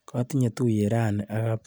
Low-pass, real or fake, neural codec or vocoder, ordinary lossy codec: none; real; none; none